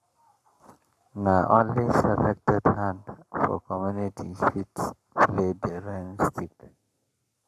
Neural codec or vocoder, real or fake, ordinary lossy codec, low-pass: codec, 44.1 kHz, 7.8 kbps, Pupu-Codec; fake; none; 14.4 kHz